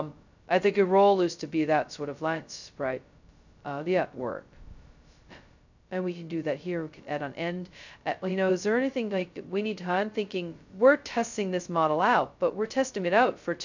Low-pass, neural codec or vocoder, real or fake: 7.2 kHz; codec, 16 kHz, 0.2 kbps, FocalCodec; fake